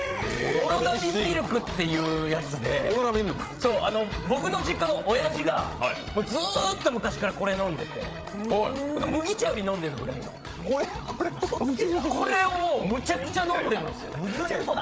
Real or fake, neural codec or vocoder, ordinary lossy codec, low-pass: fake; codec, 16 kHz, 8 kbps, FreqCodec, larger model; none; none